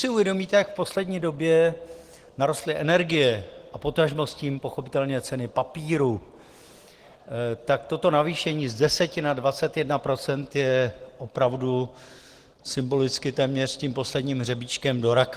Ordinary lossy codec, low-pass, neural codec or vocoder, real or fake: Opus, 16 kbps; 14.4 kHz; none; real